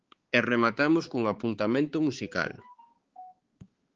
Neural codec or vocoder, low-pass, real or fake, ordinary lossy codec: codec, 16 kHz, 4 kbps, X-Codec, HuBERT features, trained on balanced general audio; 7.2 kHz; fake; Opus, 16 kbps